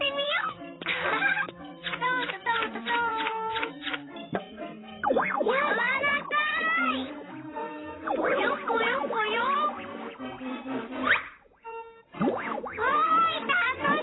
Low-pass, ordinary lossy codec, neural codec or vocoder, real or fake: 7.2 kHz; AAC, 16 kbps; vocoder, 44.1 kHz, 128 mel bands, Pupu-Vocoder; fake